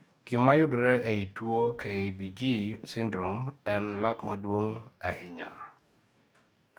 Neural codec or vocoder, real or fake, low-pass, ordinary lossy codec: codec, 44.1 kHz, 2.6 kbps, DAC; fake; none; none